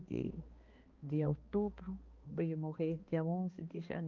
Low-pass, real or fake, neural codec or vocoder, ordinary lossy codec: 7.2 kHz; fake; codec, 16 kHz, 2 kbps, X-Codec, HuBERT features, trained on balanced general audio; Opus, 24 kbps